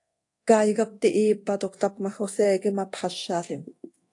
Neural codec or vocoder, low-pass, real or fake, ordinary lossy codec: codec, 24 kHz, 0.9 kbps, DualCodec; 10.8 kHz; fake; AAC, 48 kbps